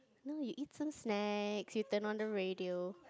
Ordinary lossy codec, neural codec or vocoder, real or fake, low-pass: none; none; real; none